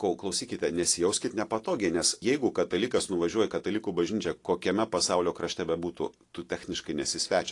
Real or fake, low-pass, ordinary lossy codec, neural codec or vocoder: real; 10.8 kHz; AAC, 48 kbps; none